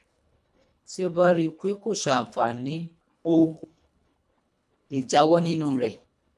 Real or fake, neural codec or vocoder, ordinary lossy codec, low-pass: fake; codec, 24 kHz, 1.5 kbps, HILCodec; none; none